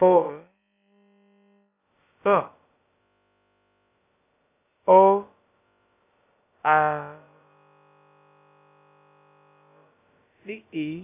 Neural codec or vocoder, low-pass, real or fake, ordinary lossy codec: codec, 16 kHz, about 1 kbps, DyCAST, with the encoder's durations; 3.6 kHz; fake; AAC, 24 kbps